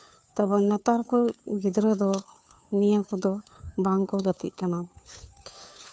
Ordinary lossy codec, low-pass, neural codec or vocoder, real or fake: none; none; codec, 16 kHz, 2 kbps, FunCodec, trained on Chinese and English, 25 frames a second; fake